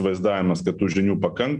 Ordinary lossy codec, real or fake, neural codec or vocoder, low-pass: MP3, 96 kbps; real; none; 9.9 kHz